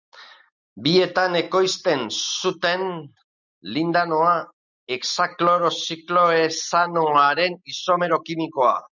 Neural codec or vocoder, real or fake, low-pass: none; real; 7.2 kHz